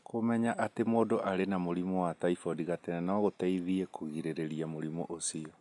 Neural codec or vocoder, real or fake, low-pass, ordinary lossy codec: none; real; 10.8 kHz; none